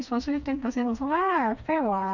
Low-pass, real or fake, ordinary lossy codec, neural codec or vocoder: 7.2 kHz; fake; none; codec, 16 kHz, 2 kbps, FreqCodec, smaller model